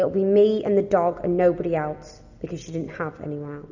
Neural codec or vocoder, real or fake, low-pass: none; real; 7.2 kHz